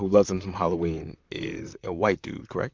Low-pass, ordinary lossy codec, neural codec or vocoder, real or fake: 7.2 kHz; MP3, 64 kbps; vocoder, 44.1 kHz, 128 mel bands, Pupu-Vocoder; fake